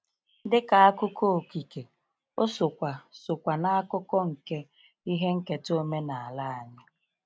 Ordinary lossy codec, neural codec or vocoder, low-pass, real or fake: none; none; none; real